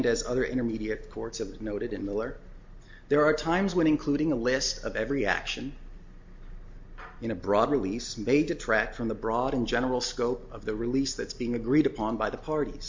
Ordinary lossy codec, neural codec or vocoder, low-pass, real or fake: MP3, 48 kbps; none; 7.2 kHz; real